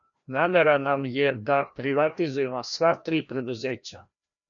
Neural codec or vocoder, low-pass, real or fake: codec, 16 kHz, 1 kbps, FreqCodec, larger model; 7.2 kHz; fake